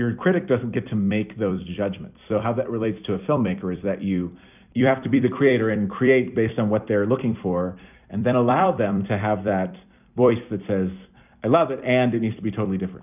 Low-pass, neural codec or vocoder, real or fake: 3.6 kHz; vocoder, 44.1 kHz, 128 mel bands every 256 samples, BigVGAN v2; fake